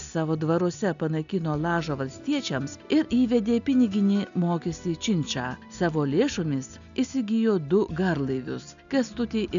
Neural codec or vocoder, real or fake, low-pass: none; real; 7.2 kHz